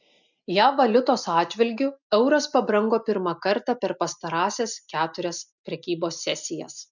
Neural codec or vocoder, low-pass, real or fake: none; 7.2 kHz; real